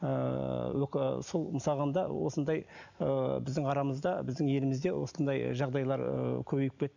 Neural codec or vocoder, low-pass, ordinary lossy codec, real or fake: none; 7.2 kHz; none; real